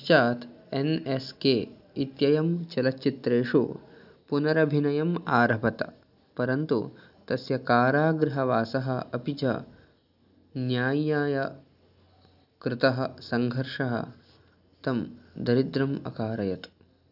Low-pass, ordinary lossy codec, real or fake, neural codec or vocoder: 5.4 kHz; none; real; none